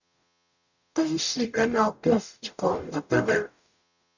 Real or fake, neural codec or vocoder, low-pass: fake; codec, 44.1 kHz, 0.9 kbps, DAC; 7.2 kHz